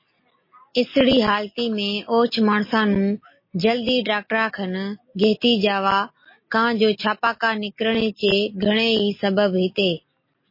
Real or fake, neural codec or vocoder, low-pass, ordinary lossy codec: real; none; 5.4 kHz; MP3, 24 kbps